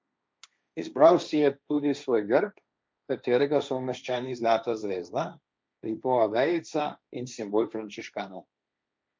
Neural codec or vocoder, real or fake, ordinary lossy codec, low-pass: codec, 16 kHz, 1.1 kbps, Voila-Tokenizer; fake; none; none